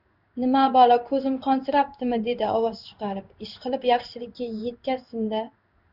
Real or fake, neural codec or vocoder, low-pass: fake; codec, 16 kHz in and 24 kHz out, 1 kbps, XY-Tokenizer; 5.4 kHz